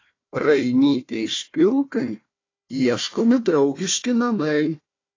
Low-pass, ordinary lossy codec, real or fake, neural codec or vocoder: 7.2 kHz; AAC, 32 kbps; fake; codec, 16 kHz, 1 kbps, FunCodec, trained on Chinese and English, 50 frames a second